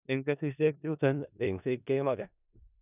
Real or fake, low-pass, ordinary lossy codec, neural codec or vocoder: fake; 3.6 kHz; none; codec, 16 kHz in and 24 kHz out, 0.4 kbps, LongCat-Audio-Codec, four codebook decoder